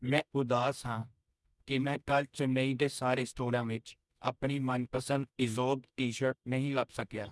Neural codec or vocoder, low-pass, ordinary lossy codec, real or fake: codec, 24 kHz, 0.9 kbps, WavTokenizer, medium music audio release; none; none; fake